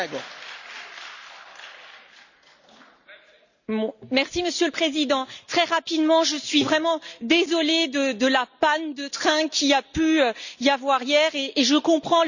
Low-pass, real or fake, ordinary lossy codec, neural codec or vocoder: 7.2 kHz; real; none; none